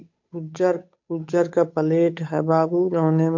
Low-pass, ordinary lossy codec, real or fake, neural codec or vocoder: 7.2 kHz; MP3, 48 kbps; fake; codec, 16 kHz, 8 kbps, FunCodec, trained on Chinese and English, 25 frames a second